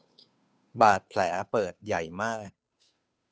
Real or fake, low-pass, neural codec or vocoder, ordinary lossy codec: fake; none; codec, 16 kHz, 2 kbps, FunCodec, trained on Chinese and English, 25 frames a second; none